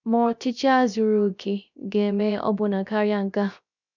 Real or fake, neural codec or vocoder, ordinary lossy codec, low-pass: fake; codec, 16 kHz, 0.3 kbps, FocalCodec; none; 7.2 kHz